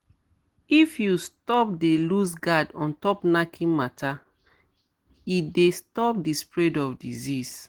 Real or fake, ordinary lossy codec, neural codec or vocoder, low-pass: real; Opus, 16 kbps; none; 19.8 kHz